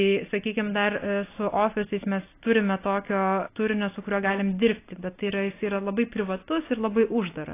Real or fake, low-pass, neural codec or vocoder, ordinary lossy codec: real; 3.6 kHz; none; AAC, 24 kbps